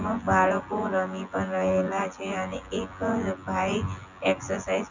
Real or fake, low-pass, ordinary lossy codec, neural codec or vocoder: fake; 7.2 kHz; none; vocoder, 24 kHz, 100 mel bands, Vocos